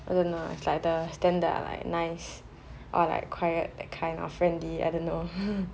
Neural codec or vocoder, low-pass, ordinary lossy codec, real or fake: none; none; none; real